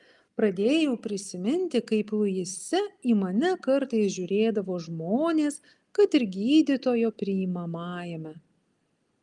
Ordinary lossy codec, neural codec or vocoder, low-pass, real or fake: Opus, 32 kbps; none; 10.8 kHz; real